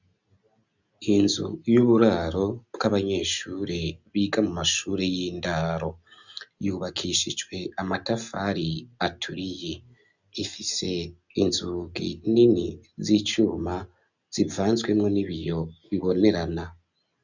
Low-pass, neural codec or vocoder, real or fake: 7.2 kHz; none; real